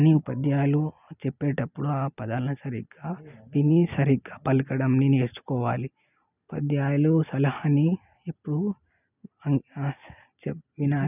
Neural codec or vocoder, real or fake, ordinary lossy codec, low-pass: none; real; none; 3.6 kHz